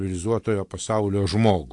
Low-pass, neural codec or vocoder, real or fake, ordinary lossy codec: 10.8 kHz; none; real; AAC, 64 kbps